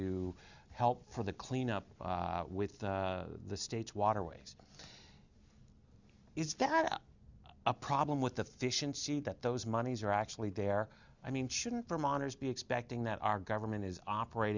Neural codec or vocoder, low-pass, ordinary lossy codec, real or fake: none; 7.2 kHz; Opus, 64 kbps; real